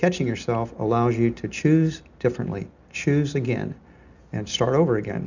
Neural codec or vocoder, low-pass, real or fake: none; 7.2 kHz; real